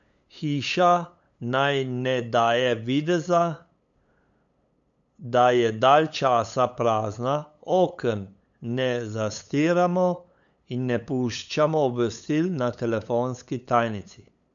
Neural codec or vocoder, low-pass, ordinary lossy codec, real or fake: codec, 16 kHz, 8 kbps, FunCodec, trained on LibriTTS, 25 frames a second; 7.2 kHz; none; fake